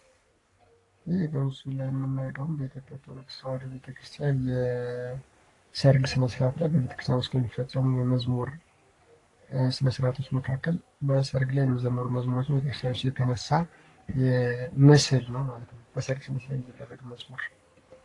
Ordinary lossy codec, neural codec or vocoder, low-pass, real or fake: AAC, 48 kbps; codec, 44.1 kHz, 3.4 kbps, Pupu-Codec; 10.8 kHz; fake